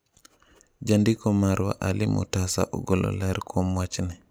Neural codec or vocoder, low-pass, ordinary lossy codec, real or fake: none; none; none; real